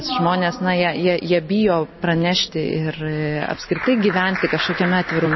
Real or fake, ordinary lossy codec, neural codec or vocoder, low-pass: real; MP3, 24 kbps; none; 7.2 kHz